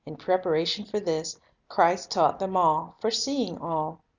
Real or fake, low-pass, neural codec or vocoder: real; 7.2 kHz; none